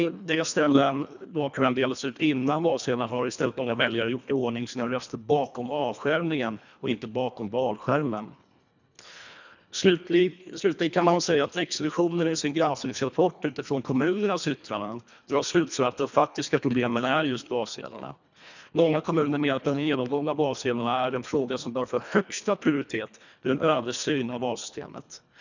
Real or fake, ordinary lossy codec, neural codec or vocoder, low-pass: fake; none; codec, 24 kHz, 1.5 kbps, HILCodec; 7.2 kHz